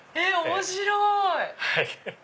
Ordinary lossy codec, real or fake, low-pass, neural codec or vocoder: none; real; none; none